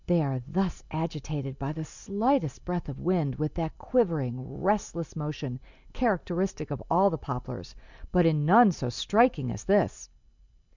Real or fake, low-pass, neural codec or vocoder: real; 7.2 kHz; none